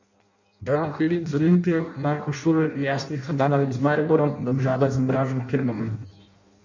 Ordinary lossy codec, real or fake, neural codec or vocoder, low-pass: none; fake; codec, 16 kHz in and 24 kHz out, 0.6 kbps, FireRedTTS-2 codec; 7.2 kHz